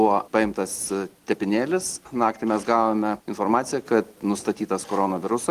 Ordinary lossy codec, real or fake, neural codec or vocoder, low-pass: Opus, 24 kbps; real; none; 14.4 kHz